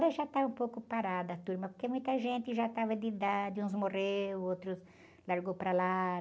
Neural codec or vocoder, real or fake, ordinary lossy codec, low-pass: none; real; none; none